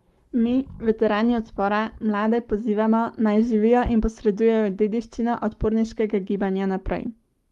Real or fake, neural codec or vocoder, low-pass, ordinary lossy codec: fake; codec, 44.1 kHz, 7.8 kbps, Pupu-Codec; 19.8 kHz; Opus, 32 kbps